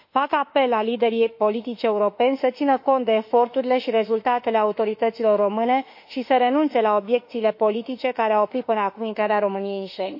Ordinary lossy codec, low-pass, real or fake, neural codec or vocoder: MP3, 32 kbps; 5.4 kHz; fake; autoencoder, 48 kHz, 32 numbers a frame, DAC-VAE, trained on Japanese speech